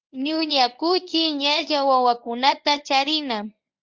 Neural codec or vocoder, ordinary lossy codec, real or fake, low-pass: codec, 24 kHz, 0.9 kbps, WavTokenizer, medium speech release version 1; Opus, 32 kbps; fake; 7.2 kHz